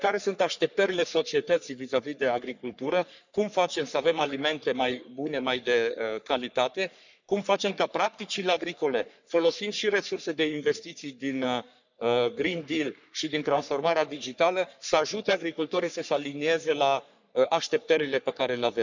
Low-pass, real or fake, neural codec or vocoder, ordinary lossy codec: 7.2 kHz; fake; codec, 44.1 kHz, 3.4 kbps, Pupu-Codec; none